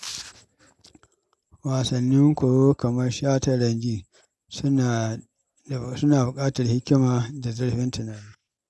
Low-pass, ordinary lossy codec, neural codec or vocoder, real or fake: none; none; none; real